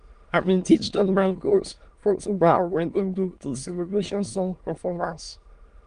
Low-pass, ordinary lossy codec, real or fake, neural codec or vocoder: 9.9 kHz; Opus, 32 kbps; fake; autoencoder, 22.05 kHz, a latent of 192 numbers a frame, VITS, trained on many speakers